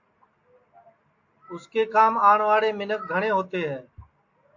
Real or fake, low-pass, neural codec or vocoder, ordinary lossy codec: real; 7.2 kHz; none; AAC, 48 kbps